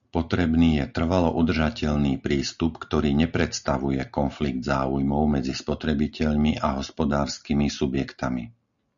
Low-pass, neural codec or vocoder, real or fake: 7.2 kHz; none; real